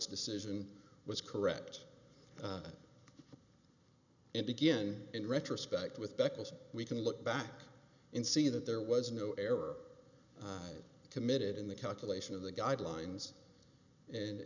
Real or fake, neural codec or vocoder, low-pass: real; none; 7.2 kHz